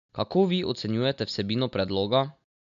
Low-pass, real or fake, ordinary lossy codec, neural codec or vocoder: 7.2 kHz; real; MP3, 64 kbps; none